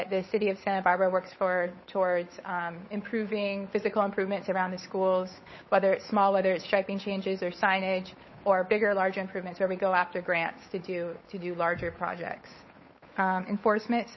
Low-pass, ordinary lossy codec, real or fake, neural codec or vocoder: 7.2 kHz; MP3, 24 kbps; fake; codec, 16 kHz, 8 kbps, FunCodec, trained on Chinese and English, 25 frames a second